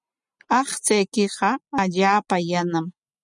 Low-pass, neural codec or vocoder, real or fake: 10.8 kHz; none; real